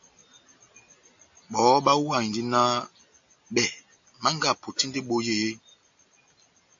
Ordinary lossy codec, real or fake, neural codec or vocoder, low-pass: AAC, 64 kbps; real; none; 7.2 kHz